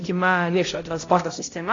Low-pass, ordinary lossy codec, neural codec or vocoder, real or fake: 7.2 kHz; AAC, 32 kbps; codec, 16 kHz, 0.5 kbps, X-Codec, HuBERT features, trained on balanced general audio; fake